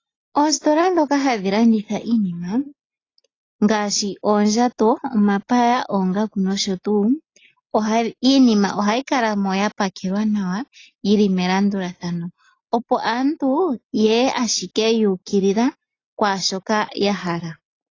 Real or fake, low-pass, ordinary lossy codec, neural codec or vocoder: real; 7.2 kHz; AAC, 32 kbps; none